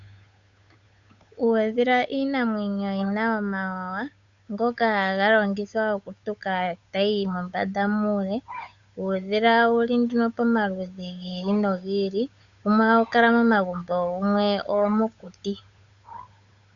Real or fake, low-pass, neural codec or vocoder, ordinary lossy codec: fake; 7.2 kHz; codec, 16 kHz, 8 kbps, FunCodec, trained on Chinese and English, 25 frames a second; Opus, 64 kbps